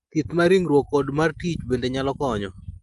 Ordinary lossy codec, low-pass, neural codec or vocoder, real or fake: Opus, 32 kbps; 10.8 kHz; none; real